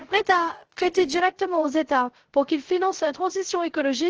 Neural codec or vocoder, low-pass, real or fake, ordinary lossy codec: codec, 16 kHz, 0.3 kbps, FocalCodec; 7.2 kHz; fake; Opus, 16 kbps